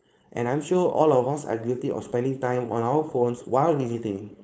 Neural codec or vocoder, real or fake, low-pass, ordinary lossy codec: codec, 16 kHz, 4.8 kbps, FACodec; fake; none; none